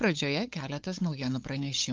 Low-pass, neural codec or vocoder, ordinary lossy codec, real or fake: 7.2 kHz; codec, 16 kHz, 8 kbps, FunCodec, trained on LibriTTS, 25 frames a second; Opus, 24 kbps; fake